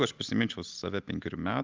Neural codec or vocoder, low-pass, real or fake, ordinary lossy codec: none; 7.2 kHz; real; Opus, 24 kbps